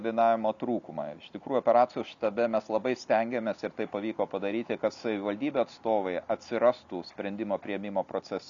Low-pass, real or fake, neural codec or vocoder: 7.2 kHz; real; none